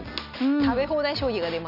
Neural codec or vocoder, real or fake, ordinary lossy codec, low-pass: none; real; none; 5.4 kHz